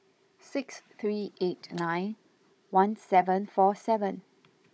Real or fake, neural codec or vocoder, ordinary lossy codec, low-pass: fake; codec, 16 kHz, 16 kbps, FunCodec, trained on Chinese and English, 50 frames a second; none; none